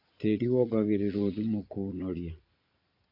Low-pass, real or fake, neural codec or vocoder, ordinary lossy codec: 5.4 kHz; fake; vocoder, 22.05 kHz, 80 mel bands, Vocos; AAC, 32 kbps